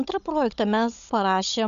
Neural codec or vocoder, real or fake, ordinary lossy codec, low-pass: codec, 16 kHz, 16 kbps, FunCodec, trained on Chinese and English, 50 frames a second; fake; AAC, 96 kbps; 7.2 kHz